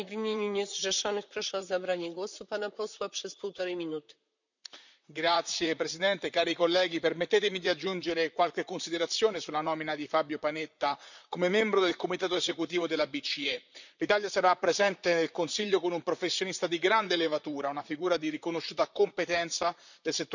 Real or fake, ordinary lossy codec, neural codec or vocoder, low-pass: fake; none; vocoder, 44.1 kHz, 128 mel bands, Pupu-Vocoder; 7.2 kHz